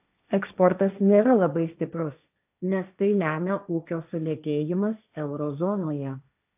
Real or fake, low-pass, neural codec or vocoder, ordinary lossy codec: fake; 3.6 kHz; codec, 16 kHz, 1.1 kbps, Voila-Tokenizer; AAC, 32 kbps